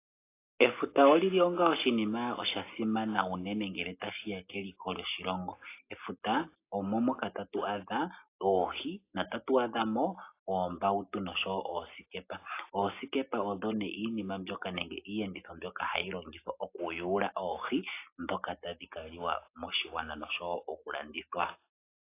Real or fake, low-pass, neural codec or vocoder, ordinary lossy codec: real; 3.6 kHz; none; AAC, 24 kbps